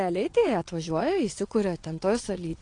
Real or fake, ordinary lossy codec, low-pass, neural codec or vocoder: fake; AAC, 48 kbps; 9.9 kHz; vocoder, 22.05 kHz, 80 mel bands, WaveNeXt